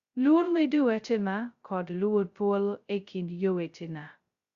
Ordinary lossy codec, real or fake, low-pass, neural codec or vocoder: Opus, 64 kbps; fake; 7.2 kHz; codec, 16 kHz, 0.2 kbps, FocalCodec